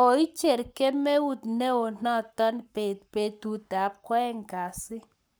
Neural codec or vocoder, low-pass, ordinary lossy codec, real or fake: codec, 44.1 kHz, 7.8 kbps, Pupu-Codec; none; none; fake